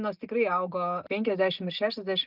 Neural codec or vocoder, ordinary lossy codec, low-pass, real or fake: none; Opus, 24 kbps; 5.4 kHz; real